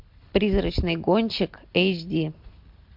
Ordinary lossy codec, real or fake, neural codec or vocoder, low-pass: MP3, 48 kbps; real; none; 5.4 kHz